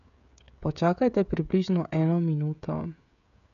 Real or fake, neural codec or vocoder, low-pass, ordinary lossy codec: fake; codec, 16 kHz, 16 kbps, FreqCodec, smaller model; 7.2 kHz; none